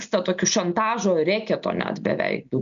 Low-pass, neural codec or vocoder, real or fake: 7.2 kHz; none; real